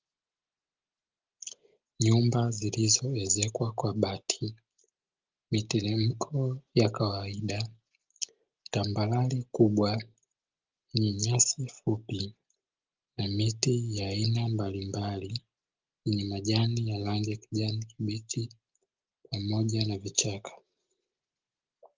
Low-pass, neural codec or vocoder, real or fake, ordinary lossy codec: 7.2 kHz; none; real; Opus, 32 kbps